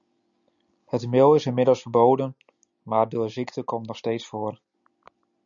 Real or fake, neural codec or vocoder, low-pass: real; none; 7.2 kHz